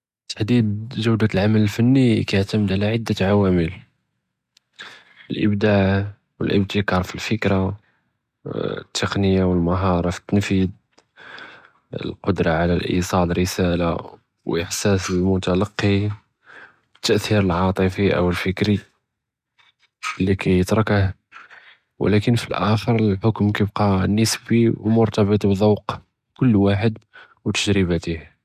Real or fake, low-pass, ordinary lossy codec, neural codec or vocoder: real; 14.4 kHz; none; none